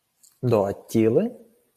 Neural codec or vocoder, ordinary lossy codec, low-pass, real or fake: none; MP3, 96 kbps; 14.4 kHz; real